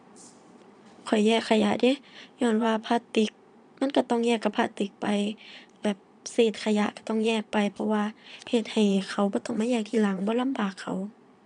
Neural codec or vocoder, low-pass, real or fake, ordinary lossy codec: vocoder, 22.05 kHz, 80 mel bands, Vocos; 9.9 kHz; fake; none